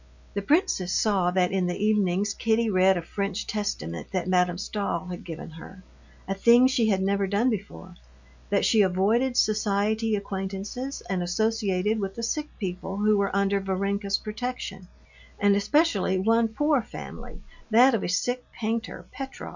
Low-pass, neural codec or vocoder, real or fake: 7.2 kHz; none; real